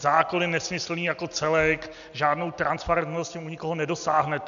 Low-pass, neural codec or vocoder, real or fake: 7.2 kHz; none; real